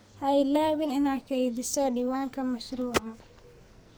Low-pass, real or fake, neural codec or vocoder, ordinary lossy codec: none; fake; codec, 44.1 kHz, 2.6 kbps, SNAC; none